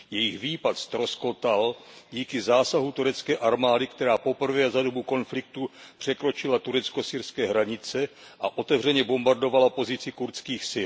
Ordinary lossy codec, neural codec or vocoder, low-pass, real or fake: none; none; none; real